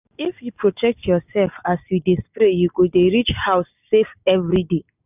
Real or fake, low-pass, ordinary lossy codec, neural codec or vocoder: real; 3.6 kHz; none; none